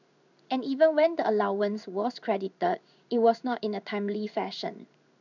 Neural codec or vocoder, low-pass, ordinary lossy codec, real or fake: codec, 16 kHz in and 24 kHz out, 1 kbps, XY-Tokenizer; 7.2 kHz; none; fake